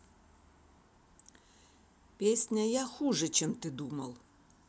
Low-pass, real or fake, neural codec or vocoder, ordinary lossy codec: none; real; none; none